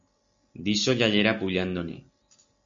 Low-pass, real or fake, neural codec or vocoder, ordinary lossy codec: 7.2 kHz; real; none; MP3, 64 kbps